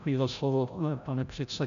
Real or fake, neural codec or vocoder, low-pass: fake; codec, 16 kHz, 0.5 kbps, FreqCodec, larger model; 7.2 kHz